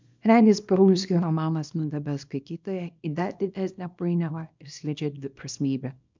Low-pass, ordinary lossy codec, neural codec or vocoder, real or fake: 7.2 kHz; MP3, 64 kbps; codec, 24 kHz, 0.9 kbps, WavTokenizer, small release; fake